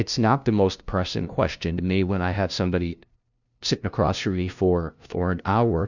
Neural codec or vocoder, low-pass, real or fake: codec, 16 kHz, 0.5 kbps, FunCodec, trained on LibriTTS, 25 frames a second; 7.2 kHz; fake